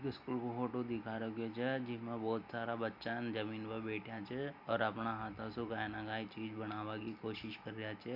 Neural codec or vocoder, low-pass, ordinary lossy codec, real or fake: none; 5.4 kHz; none; real